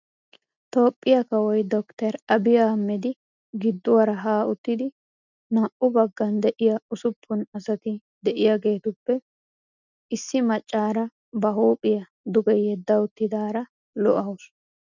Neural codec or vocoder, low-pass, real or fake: none; 7.2 kHz; real